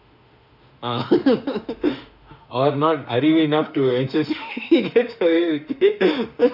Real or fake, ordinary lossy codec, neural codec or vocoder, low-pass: fake; none; autoencoder, 48 kHz, 32 numbers a frame, DAC-VAE, trained on Japanese speech; 5.4 kHz